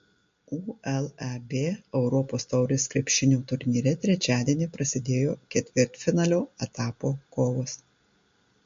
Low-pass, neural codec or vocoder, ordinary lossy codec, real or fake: 7.2 kHz; none; MP3, 48 kbps; real